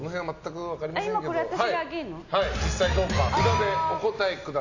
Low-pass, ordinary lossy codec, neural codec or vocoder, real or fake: 7.2 kHz; AAC, 48 kbps; none; real